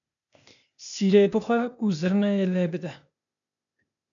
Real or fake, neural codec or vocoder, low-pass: fake; codec, 16 kHz, 0.8 kbps, ZipCodec; 7.2 kHz